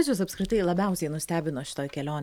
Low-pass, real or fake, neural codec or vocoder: 19.8 kHz; real; none